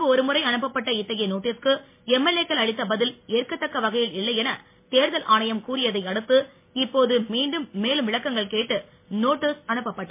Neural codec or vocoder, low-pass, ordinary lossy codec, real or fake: none; 3.6 kHz; MP3, 24 kbps; real